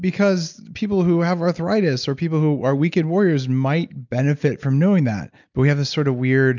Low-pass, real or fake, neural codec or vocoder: 7.2 kHz; real; none